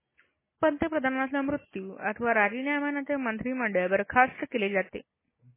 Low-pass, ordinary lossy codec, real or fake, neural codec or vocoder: 3.6 kHz; MP3, 16 kbps; real; none